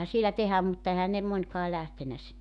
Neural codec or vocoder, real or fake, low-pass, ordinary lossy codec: autoencoder, 48 kHz, 128 numbers a frame, DAC-VAE, trained on Japanese speech; fake; 10.8 kHz; none